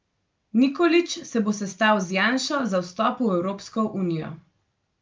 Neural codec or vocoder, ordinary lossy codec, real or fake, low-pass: none; Opus, 24 kbps; real; 7.2 kHz